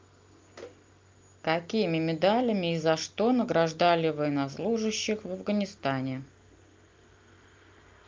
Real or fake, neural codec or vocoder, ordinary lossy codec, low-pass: real; none; Opus, 32 kbps; 7.2 kHz